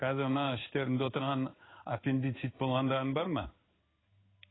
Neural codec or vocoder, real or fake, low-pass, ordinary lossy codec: codec, 16 kHz in and 24 kHz out, 1 kbps, XY-Tokenizer; fake; 7.2 kHz; AAC, 16 kbps